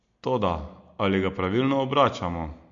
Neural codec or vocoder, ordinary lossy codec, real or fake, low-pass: none; MP3, 48 kbps; real; 7.2 kHz